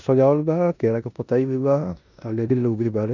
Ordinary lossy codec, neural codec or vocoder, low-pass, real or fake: none; codec, 16 kHz in and 24 kHz out, 0.9 kbps, LongCat-Audio-Codec, four codebook decoder; 7.2 kHz; fake